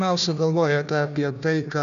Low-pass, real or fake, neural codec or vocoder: 7.2 kHz; fake; codec, 16 kHz, 1 kbps, FunCodec, trained on Chinese and English, 50 frames a second